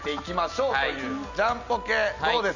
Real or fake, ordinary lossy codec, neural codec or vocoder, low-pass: real; none; none; 7.2 kHz